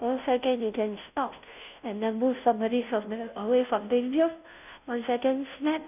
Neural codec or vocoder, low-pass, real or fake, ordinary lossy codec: codec, 16 kHz, 0.5 kbps, FunCodec, trained on Chinese and English, 25 frames a second; 3.6 kHz; fake; none